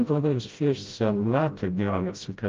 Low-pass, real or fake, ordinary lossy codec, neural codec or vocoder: 7.2 kHz; fake; Opus, 24 kbps; codec, 16 kHz, 0.5 kbps, FreqCodec, smaller model